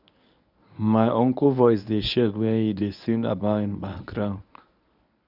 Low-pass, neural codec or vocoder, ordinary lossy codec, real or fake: 5.4 kHz; codec, 24 kHz, 0.9 kbps, WavTokenizer, medium speech release version 1; none; fake